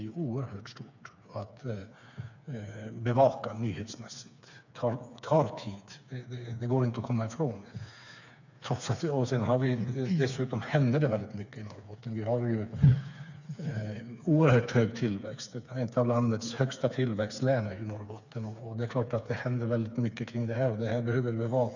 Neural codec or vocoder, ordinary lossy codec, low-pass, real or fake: codec, 16 kHz, 4 kbps, FreqCodec, smaller model; none; 7.2 kHz; fake